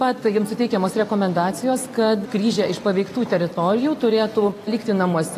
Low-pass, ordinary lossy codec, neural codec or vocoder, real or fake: 14.4 kHz; AAC, 48 kbps; vocoder, 44.1 kHz, 128 mel bands every 256 samples, BigVGAN v2; fake